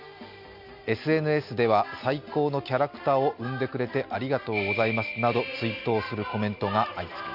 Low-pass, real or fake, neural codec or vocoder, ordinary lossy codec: 5.4 kHz; real; none; none